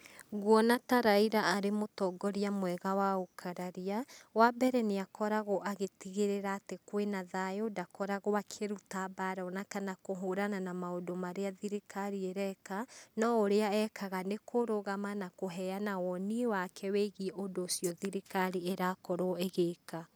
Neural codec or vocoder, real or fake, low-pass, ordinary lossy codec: none; real; none; none